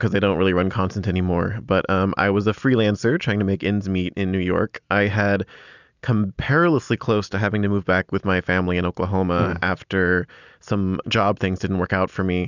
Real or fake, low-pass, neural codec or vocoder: real; 7.2 kHz; none